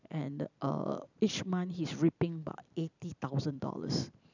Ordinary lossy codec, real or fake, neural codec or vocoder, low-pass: none; fake; vocoder, 44.1 kHz, 128 mel bands every 256 samples, BigVGAN v2; 7.2 kHz